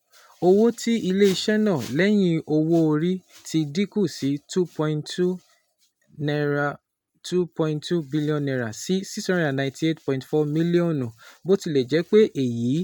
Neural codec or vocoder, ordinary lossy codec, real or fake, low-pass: none; none; real; 19.8 kHz